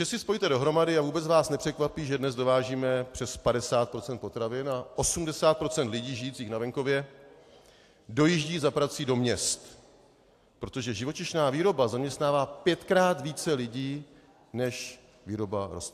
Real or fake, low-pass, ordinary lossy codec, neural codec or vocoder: real; 14.4 kHz; AAC, 64 kbps; none